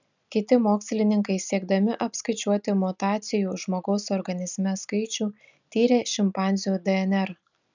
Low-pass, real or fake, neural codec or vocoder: 7.2 kHz; real; none